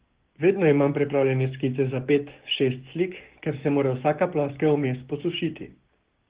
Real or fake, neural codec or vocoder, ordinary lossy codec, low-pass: fake; codec, 16 kHz, 6 kbps, DAC; Opus, 16 kbps; 3.6 kHz